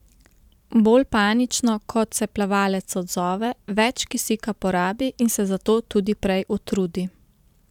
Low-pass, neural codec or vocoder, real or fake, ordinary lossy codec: 19.8 kHz; vocoder, 44.1 kHz, 128 mel bands every 512 samples, BigVGAN v2; fake; none